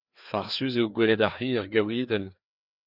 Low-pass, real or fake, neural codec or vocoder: 5.4 kHz; fake; codec, 16 kHz, 2 kbps, FreqCodec, larger model